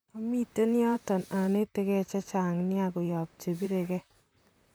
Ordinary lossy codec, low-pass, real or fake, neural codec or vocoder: none; none; fake; vocoder, 44.1 kHz, 128 mel bands, Pupu-Vocoder